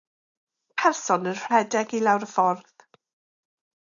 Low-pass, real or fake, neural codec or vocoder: 7.2 kHz; real; none